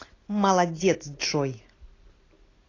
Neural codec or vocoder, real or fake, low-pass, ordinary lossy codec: none; real; 7.2 kHz; AAC, 32 kbps